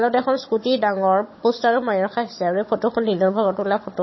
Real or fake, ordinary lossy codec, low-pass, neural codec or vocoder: fake; MP3, 24 kbps; 7.2 kHz; codec, 16 kHz, 16 kbps, FunCodec, trained on Chinese and English, 50 frames a second